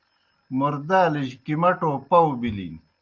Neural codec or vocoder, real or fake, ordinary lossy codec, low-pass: none; real; Opus, 24 kbps; 7.2 kHz